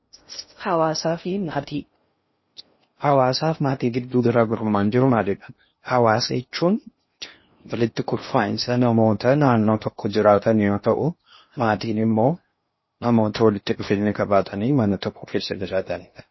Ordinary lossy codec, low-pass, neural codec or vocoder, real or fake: MP3, 24 kbps; 7.2 kHz; codec, 16 kHz in and 24 kHz out, 0.6 kbps, FocalCodec, streaming, 2048 codes; fake